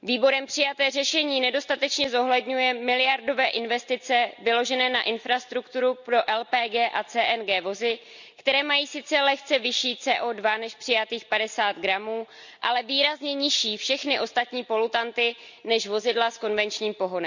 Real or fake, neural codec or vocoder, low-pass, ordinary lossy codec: real; none; 7.2 kHz; none